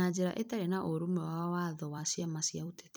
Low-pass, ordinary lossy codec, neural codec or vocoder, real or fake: none; none; vocoder, 44.1 kHz, 128 mel bands every 256 samples, BigVGAN v2; fake